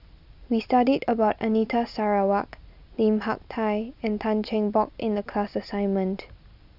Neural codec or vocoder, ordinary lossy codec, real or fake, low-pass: none; none; real; 5.4 kHz